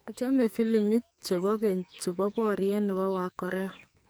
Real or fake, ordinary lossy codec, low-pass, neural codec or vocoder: fake; none; none; codec, 44.1 kHz, 2.6 kbps, SNAC